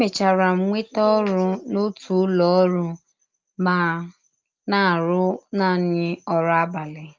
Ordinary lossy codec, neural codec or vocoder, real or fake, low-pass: Opus, 24 kbps; none; real; 7.2 kHz